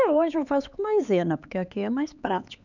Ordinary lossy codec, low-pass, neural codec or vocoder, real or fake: none; 7.2 kHz; codec, 16 kHz, 4 kbps, X-Codec, HuBERT features, trained on LibriSpeech; fake